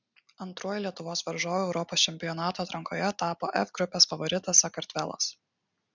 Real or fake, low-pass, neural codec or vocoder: real; 7.2 kHz; none